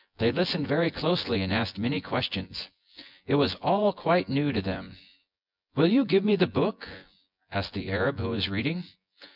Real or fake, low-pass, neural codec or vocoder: fake; 5.4 kHz; vocoder, 24 kHz, 100 mel bands, Vocos